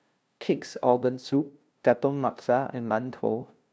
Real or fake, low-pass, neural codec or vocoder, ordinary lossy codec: fake; none; codec, 16 kHz, 0.5 kbps, FunCodec, trained on LibriTTS, 25 frames a second; none